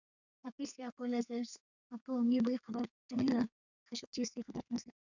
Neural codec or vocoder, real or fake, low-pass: codec, 32 kHz, 1.9 kbps, SNAC; fake; 7.2 kHz